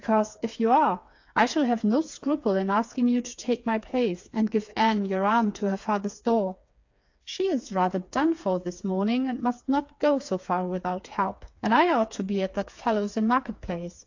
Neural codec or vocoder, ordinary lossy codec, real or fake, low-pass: codec, 16 kHz, 4 kbps, FreqCodec, smaller model; AAC, 48 kbps; fake; 7.2 kHz